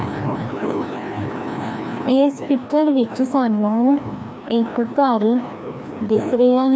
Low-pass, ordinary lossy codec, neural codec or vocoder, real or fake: none; none; codec, 16 kHz, 1 kbps, FreqCodec, larger model; fake